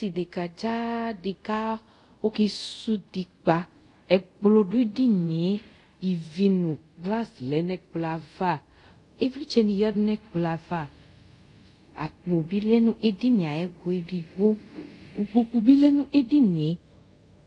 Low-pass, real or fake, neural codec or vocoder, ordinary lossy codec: 10.8 kHz; fake; codec, 24 kHz, 0.5 kbps, DualCodec; AAC, 48 kbps